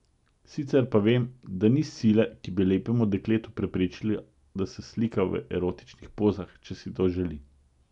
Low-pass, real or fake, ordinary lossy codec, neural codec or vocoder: 10.8 kHz; real; none; none